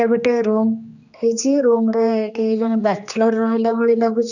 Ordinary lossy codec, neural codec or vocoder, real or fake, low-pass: none; codec, 16 kHz, 2 kbps, X-Codec, HuBERT features, trained on general audio; fake; 7.2 kHz